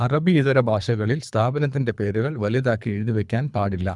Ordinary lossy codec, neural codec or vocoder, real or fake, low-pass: none; codec, 24 kHz, 3 kbps, HILCodec; fake; 10.8 kHz